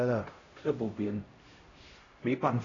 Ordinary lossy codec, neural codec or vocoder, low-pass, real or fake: AAC, 32 kbps; codec, 16 kHz, 0.5 kbps, X-Codec, HuBERT features, trained on LibriSpeech; 7.2 kHz; fake